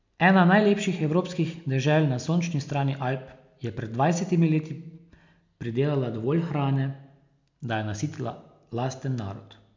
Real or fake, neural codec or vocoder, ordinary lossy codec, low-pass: real; none; MP3, 64 kbps; 7.2 kHz